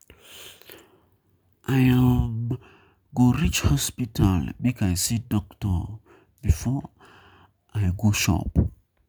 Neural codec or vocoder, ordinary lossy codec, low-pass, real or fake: none; none; none; real